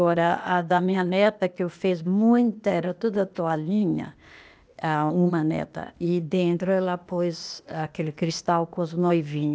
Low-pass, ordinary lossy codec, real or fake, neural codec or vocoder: none; none; fake; codec, 16 kHz, 0.8 kbps, ZipCodec